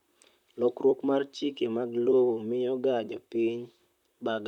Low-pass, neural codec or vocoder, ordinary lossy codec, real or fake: 19.8 kHz; vocoder, 44.1 kHz, 128 mel bands, Pupu-Vocoder; none; fake